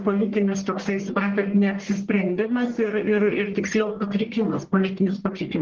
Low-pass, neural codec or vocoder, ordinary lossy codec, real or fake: 7.2 kHz; codec, 44.1 kHz, 1.7 kbps, Pupu-Codec; Opus, 16 kbps; fake